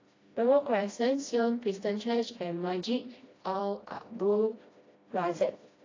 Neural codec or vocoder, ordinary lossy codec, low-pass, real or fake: codec, 16 kHz, 1 kbps, FreqCodec, smaller model; AAC, 32 kbps; 7.2 kHz; fake